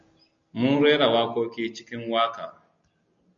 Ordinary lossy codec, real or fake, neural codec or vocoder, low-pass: MP3, 64 kbps; real; none; 7.2 kHz